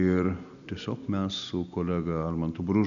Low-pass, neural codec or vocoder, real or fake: 7.2 kHz; none; real